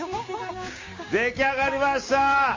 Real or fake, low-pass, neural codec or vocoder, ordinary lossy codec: fake; 7.2 kHz; codec, 16 kHz, 6 kbps, DAC; MP3, 32 kbps